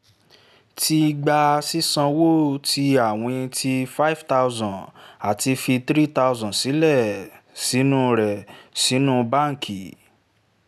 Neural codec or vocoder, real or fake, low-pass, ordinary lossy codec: none; real; 14.4 kHz; none